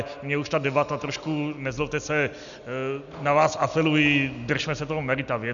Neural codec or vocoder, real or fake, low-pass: none; real; 7.2 kHz